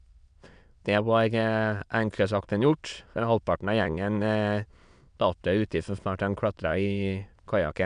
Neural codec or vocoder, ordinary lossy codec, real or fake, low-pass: autoencoder, 22.05 kHz, a latent of 192 numbers a frame, VITS, trained on many speakers; none; fake; 9.9 kHz